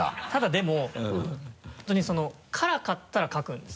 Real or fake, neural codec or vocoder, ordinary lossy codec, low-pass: real; none; none; none